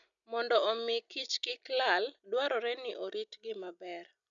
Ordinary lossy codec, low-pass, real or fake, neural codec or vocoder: none; 7.2 kHz; real; none